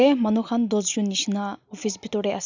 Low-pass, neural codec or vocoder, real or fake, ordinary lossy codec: 7.2 kHz; none; real; none